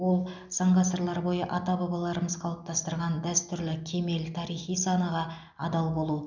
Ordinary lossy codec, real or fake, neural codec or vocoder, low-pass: none; real; none; 7.2 kHz